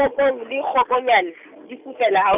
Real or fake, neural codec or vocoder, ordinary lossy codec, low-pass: real; none; none; 3.6 kHz